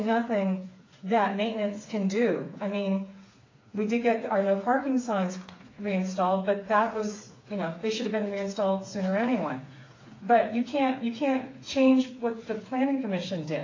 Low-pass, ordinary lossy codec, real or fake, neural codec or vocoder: 7.2 kHz; AAC, 32 kbps; fake; codec, 16 kHz, 4 kbps, FreqCodec, smaller model